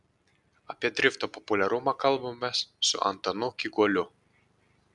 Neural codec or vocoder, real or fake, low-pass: none; real; 10.8 kHz